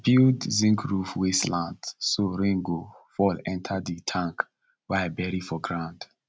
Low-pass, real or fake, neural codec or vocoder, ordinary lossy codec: none; real; none; none